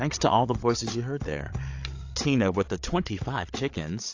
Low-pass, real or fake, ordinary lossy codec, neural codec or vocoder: 7.2 kHz; fake; AAC, 48 kbps; codec, 16 kHz, 8 kbps, FreqCodec, larger model